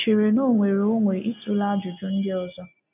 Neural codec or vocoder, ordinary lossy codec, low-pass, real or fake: none; none; 3.6 kHz; real